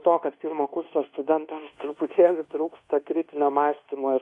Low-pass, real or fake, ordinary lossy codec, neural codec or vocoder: 10.8 kHz; fake; AAC, 48 kbps; codec, 24 kHz, 1.2 kbps, DualCodec